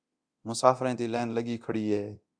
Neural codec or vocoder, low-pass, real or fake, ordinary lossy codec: codec, 24 kHz, 0.9 kbps, DualCodec; 9.9 kHz; fake; Opus, 64 kbps